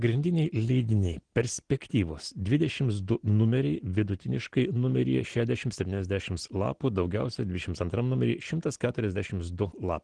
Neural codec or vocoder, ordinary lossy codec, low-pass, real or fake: none; Opus, 16 kbps; 9.9 kHz; real